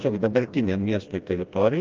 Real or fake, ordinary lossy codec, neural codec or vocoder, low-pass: fake; Opus, 24 kbps; codec, 16 kHz, 1 kbps, FreqCodec, smaller model; 7.2 kHz